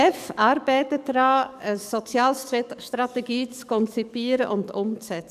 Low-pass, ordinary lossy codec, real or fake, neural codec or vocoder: 14.4 kHz; none; fake; codec, 44.1 kHz, 7.8 kbps, DAC